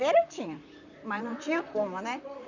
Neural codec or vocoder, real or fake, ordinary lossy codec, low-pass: vocoder, 44.1 kHz, 128 mel bands, Pupu-Vocoder; fake; none; 7.2 kHz